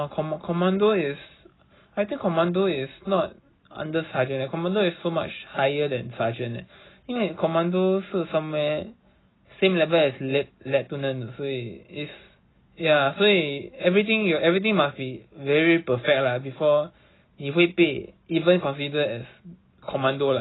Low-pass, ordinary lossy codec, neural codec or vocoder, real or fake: 7.2 kHz; AAC, 16 kbps; none; real